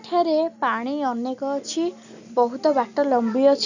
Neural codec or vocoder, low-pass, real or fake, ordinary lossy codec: none; 7.2 kHz; real; none